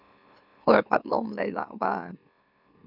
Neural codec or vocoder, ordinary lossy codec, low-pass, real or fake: autoencoder, 44.1 kHz, a latent of 192 numbers a frame, MeloTTS; none; 5.4 kHz; fake